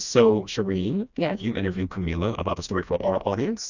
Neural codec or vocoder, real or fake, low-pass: codec, 16 kHz, 1 kbps, FreqCodec, smaller model; fake; 7.2 kHz